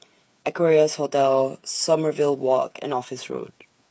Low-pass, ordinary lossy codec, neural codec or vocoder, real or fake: none; none; codec, 16 kHz, 8 kbps, FreqCodec, smaller model; fake